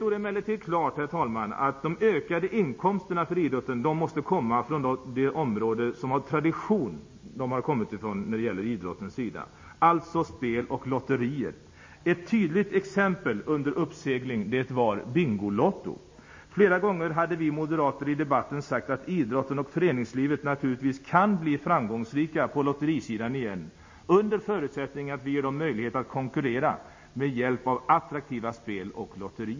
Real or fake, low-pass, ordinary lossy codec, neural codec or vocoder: real; 7.2 kHz; MP3, 32 kbps; none